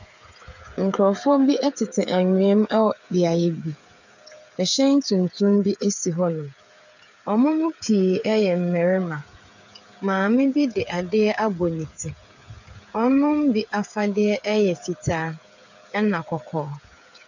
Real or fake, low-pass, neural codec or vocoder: fake; 7.2 kHz; codec, 16 kHz, 8 kbps, FreqCodec, smaller model